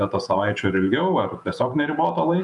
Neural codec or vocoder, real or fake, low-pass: vocoder, 48 kHz, 128 mel bands, Vocos; fake; 10.8 kHz